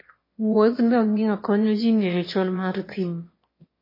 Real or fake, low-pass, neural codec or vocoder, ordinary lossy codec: fake; 5.4 kHz; autoencoder, 22.05 kHz, a latent of 192 numbers a frame, VITS, trained on one speaker; MP3, 24 kbps